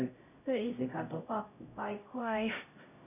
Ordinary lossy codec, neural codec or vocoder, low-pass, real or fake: none; codec, 16 kHz, 0.5 kbps, X-Codec, HuBERT features, trained on LibriSpeech; 3.6 kHz; fake